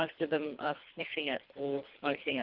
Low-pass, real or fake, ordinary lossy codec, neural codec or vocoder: 5.4 kHz; fake; Opus, 32 kbps; codec, 24 kHz, 3 kbps, HILCodec